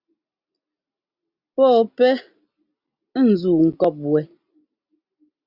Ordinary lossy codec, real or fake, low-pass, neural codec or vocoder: Opus, 64 kbps; real; 5.4 kHz; none